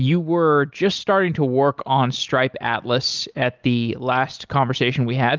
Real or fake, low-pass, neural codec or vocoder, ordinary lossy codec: real; 7.2 kHz; none; Opus, 24 kbps